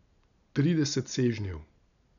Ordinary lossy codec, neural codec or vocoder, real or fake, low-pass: none; none; real; 7.2 kHz